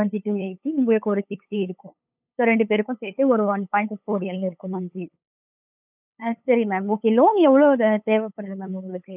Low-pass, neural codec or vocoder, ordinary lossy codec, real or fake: 3.6 kHz; codec, 16 kHz, 8 kbps, FunCodec, trained on LibriTTS, 25 frames a second; none; fake